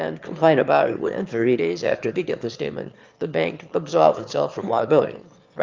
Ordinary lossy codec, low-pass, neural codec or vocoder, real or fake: Opus, 24 kbps; 7.2 kHz; autoencoder, 22.05 kHz, a latent of 192 numbers a frame, VITS, trained on one speaker; fake